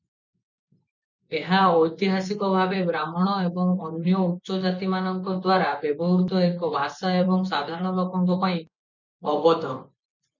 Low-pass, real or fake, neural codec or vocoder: 7.2 kHz; fake; vocoder, 24 kHz, 100 mel bands, Vocos